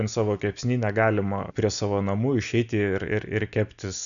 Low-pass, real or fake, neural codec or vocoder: 7.2 kHz; real; none